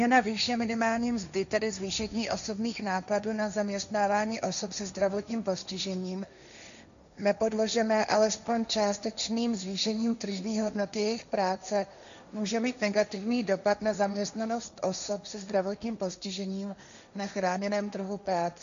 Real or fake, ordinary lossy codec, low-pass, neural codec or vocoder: fake; MP3, 96 kbps; 7.2 kHz; codec, 16 kHz, 1.1 kbps, Voila-Tokenizer